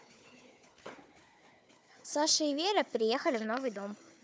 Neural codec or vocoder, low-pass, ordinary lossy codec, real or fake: codec, 16 kHz, 4 kbps, FunCodec, trained on Chinese and English, 50 frames a second; none; none; fake